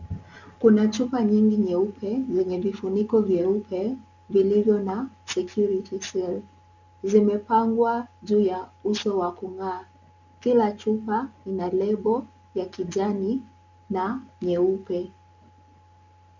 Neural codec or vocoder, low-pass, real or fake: none; 7.2 kHz; real